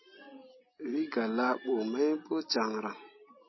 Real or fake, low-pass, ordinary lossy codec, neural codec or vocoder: real; 7.2 kHz; MP3, 24 kbps; none